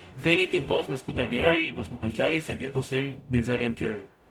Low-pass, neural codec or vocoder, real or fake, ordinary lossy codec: 19.8 kHz; codec, 44.1 kHz, 0.9 kbps, DAC; fake; none